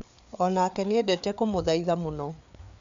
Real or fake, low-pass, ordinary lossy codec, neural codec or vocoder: fake; 7.2 kHz; MP3, 64 kbps; codec, 16 kHz, 4 kbps, FreqCodec, larger model